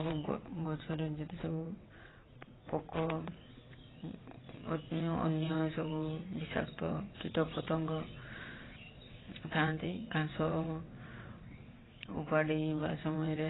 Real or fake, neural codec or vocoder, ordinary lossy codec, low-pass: fake; vocoder, 22.05 kHz, 80 mel bands, WaveNeXt; AAC, 16 kbps; 7.2 kHz